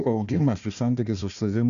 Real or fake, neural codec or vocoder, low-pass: fake; codec, 16 kHz, 1 kbps, FunCodec, trained on Chinese and English, 50 frames a second; 7.2 kHz